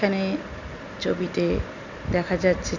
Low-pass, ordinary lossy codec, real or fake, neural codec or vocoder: 7.2 kHz; none; real; none